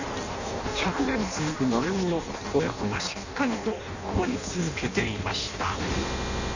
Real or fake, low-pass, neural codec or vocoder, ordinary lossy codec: fake; 7.2 kHz; codec, 16 kHz in and 24 kHz out, 0.6 kbps, FireRedTTS-2 codec; none